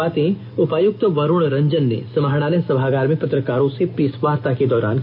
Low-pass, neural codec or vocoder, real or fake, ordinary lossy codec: 5.4 kHz; none; real; none